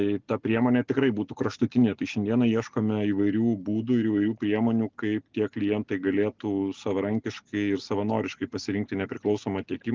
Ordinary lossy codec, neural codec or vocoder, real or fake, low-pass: Opus, 32 kbps; none; real; 7.2 kHz